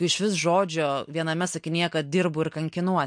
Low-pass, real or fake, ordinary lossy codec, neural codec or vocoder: 9.9 kHz; real; MP3, 64 kbps; none